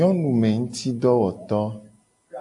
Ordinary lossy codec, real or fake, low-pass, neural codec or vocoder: AAC, 64 kbps; real; 10.8 kHz; none